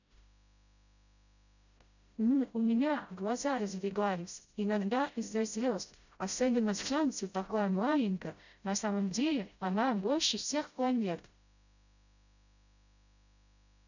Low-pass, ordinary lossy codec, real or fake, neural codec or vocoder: 7.2 kHz; none; fake; codec, 16 kHz, 0.5 kbps, FreqCodec, smaller model